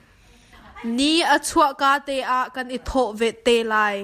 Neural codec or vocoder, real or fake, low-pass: none; real; 14.4 kHz